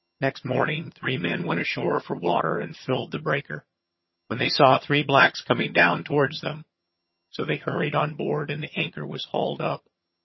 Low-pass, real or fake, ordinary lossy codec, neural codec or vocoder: 7.2 kHz; fake; MP3, 24 kbps; vocoder, 22.05 kHz, 80 mel bands, HiFi-GAN